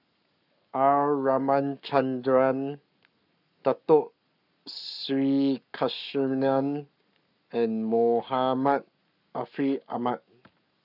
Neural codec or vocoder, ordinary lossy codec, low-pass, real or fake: codec, 44.1 kHz, 7.8 kbps, Pupu-Codec; none; 5.4 kHz; fake